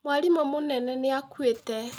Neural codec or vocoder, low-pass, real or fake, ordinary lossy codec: none; none; real; none